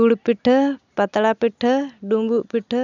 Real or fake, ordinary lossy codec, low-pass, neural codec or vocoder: real; none; 7.2 kHz; none